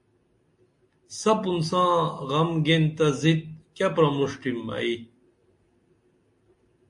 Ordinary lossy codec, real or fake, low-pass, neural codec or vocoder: MP3, 48 kbps; real; 10.8 kHz; none